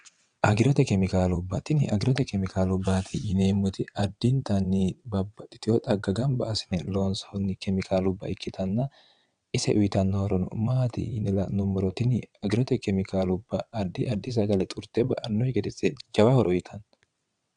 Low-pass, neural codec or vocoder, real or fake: 9.9 kHz; vocoder, 22.05 kHz, 80 mel bands, WaveNeXt; fake